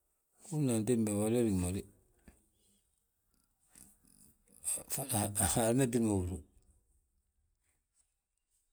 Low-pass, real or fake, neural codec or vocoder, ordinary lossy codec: none; real; none; none